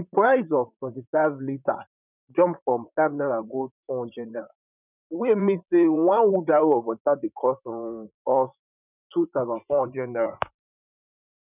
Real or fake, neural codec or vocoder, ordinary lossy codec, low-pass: fake; vocoder, 44.1 kHz, 128 mel bands, Pupu-Vocoder; none; 3.6 kHz